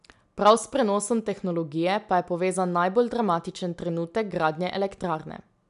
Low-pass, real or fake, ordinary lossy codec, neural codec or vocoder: 10.8 kHz; real; none; none